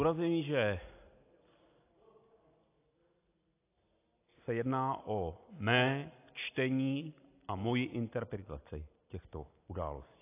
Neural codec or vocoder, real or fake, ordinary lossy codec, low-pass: none; real; AAC, 24 kbps; 3.6 kHz